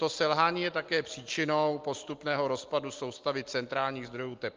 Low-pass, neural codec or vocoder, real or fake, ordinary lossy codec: 7.2 kHz; none; real; Opus, 24 kbps